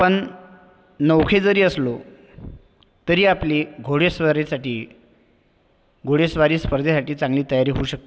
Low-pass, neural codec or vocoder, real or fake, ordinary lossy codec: none; none; real; none